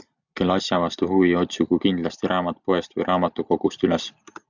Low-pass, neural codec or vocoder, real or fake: 7.2 kHz; none; real